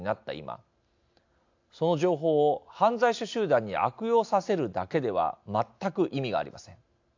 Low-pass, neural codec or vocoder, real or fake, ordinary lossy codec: 7.2 kHz; none; real; AAC, 48 kbps